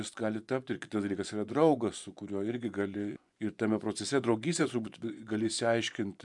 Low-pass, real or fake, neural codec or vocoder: 10.8 kHz; fake; vocoder, 24 kHz, 100 mel bands, Vocos